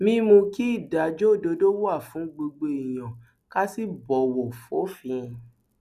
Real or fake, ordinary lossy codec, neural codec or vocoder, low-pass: real; none; none; 14.4 kHz